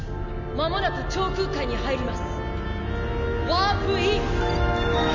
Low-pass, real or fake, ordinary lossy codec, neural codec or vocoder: 7.2 kHz; real; none; none